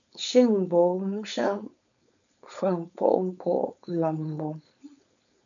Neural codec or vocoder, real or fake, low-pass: codec, 16 kHz, 4.8 kbps, FACodec; fake; 7.2 kHz